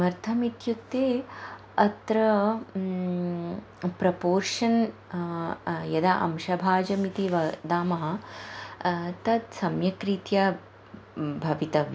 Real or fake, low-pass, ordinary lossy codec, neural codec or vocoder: real; none; none; none